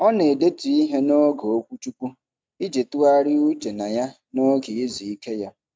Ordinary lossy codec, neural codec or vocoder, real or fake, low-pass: none; none; real; none